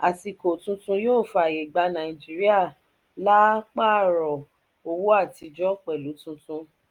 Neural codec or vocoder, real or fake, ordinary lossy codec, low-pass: none; real; Opus, 32 kbps; 19.8 kHz